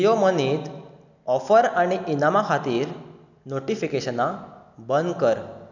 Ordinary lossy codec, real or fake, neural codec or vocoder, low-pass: none; real; none; 7.2 kHz